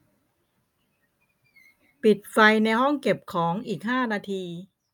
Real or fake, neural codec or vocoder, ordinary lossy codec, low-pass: real; none; none; 19.8 kHz